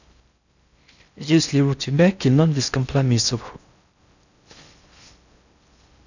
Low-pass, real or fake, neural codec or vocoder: 7.2 kHz; fake; codec, 16 kHz in and 24 kHz out, 0.6 kbps, FocalCodec, streaming, 4096 codes